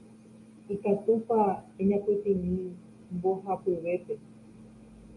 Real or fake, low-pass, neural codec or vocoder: real; 10.8 kHz; none